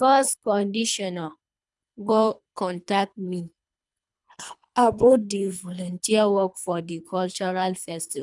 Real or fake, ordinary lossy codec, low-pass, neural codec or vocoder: fake; none; 10.8 kHz; codec, 24 kHz, 3 kbps, HILCodec